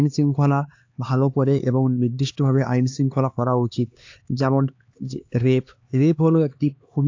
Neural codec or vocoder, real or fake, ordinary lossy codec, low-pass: codec, 16 kHz, 2 kbps, X-Codec, HuBERT features, trained on LibriSpeech; fake; AAC, 48 kbps; 7.2 kHz